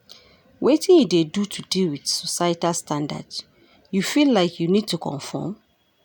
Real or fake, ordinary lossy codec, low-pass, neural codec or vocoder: real; none; none; none